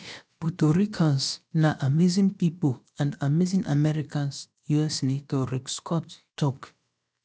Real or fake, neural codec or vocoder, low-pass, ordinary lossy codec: fake; codec, 16 kHz, about 1 kbps, DyCAST, with the encoder's durations; none; none